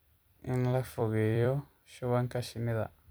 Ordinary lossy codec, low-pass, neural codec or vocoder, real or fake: none; none; vocoder, 44.1 kHz, 128 mel bands every 256 samples, BigVGAN v2; fake